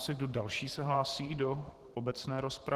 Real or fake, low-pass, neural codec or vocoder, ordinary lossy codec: fake; 14.4 kHz; vocoder, 44.1 kHz, 128 mel bands every 512 samples, BigVGAN v2; Opus, 16 kbps